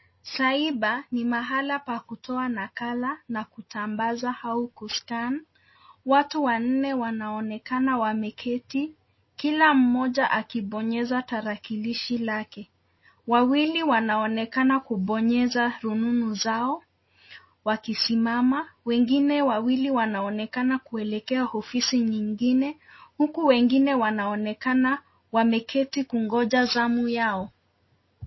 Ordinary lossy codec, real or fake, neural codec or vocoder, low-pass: MP3, 24 kbps; real; none; 7.2 kHz